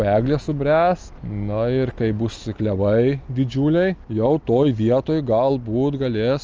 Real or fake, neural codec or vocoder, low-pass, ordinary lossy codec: real; none; 7.2 kHz; Opus, 24 kbps